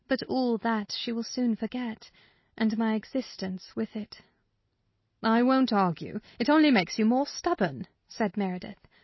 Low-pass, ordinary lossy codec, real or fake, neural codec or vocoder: 7.2 kHz; MP3, 24 kbps; real; none